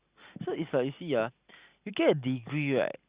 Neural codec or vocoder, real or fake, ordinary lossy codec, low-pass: none; real; Opus, 64 kbps; 3.6 kHz